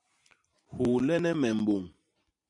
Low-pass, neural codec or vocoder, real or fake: 10.8 kHz; vocoder, 44.1 kHz, 128 mel bands every 512 samples, BigVGAN v2; fake